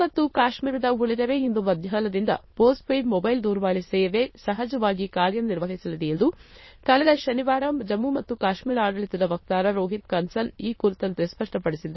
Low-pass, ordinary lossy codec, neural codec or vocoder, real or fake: 7.2 kHz; MP3, 24 kbps; autoencoder, 22.05 kHz, a latent of 192 numbers a frame, VITS, trained on many speakers; fake